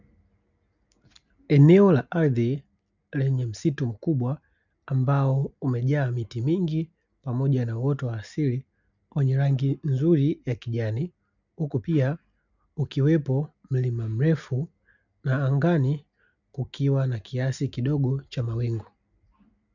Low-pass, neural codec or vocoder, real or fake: 7.2 kHz; none; real